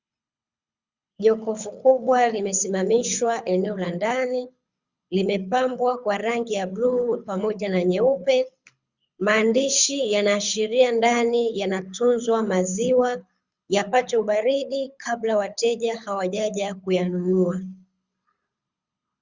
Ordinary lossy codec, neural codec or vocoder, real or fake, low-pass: Opus, 64 kbps; codec, 24 kHz, 6 kbps, HILCodec; fake; 7.2 kHz